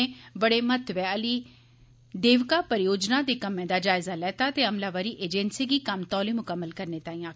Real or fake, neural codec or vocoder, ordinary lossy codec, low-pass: real; none; none; none